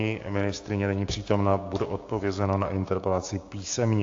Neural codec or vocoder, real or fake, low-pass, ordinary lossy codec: codec, 16 kHz, 6 kbps, DAC; fake; 7.2 kHz; AAC, 48 kbps